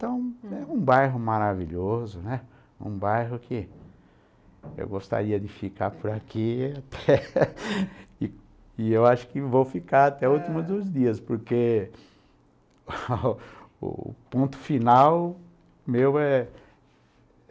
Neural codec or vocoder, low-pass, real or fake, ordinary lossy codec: none; none; real; none